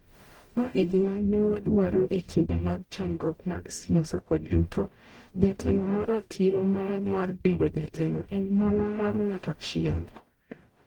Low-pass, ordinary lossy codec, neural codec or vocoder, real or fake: 19.8 kHz; Opus, 24 kbps; codec, 44.1 kHz, 0.9 kbps, DAC; fake